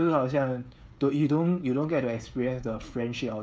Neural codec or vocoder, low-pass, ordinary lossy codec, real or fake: codec, 16 kHz, 16 kbps, FreqCodec, smaller model; none; none; fake